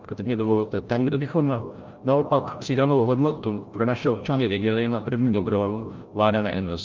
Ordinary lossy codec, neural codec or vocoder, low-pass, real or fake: Opus, 24 kbps; codec, 16 kHz, 0.5 kbps, FreqCodec, larger model; 7.2 kHz; fake